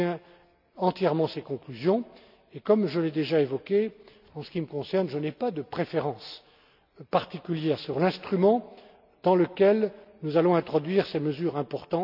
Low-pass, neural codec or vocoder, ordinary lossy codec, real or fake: 5.4 kHz; none; none; real